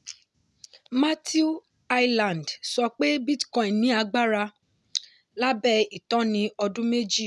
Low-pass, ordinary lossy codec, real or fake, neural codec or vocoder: none; none; real; none